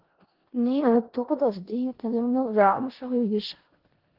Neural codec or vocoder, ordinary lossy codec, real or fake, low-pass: codec, 16 kHz in and 24 kHz out, 0.4 kbps, LongCat-Audio-Codec, four codebook decoder; Opus, 16 kbps; fake; 5.4 kHz